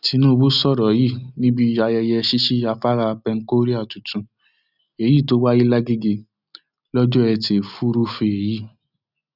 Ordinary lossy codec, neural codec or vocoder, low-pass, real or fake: none; none; 5.4 kHz; real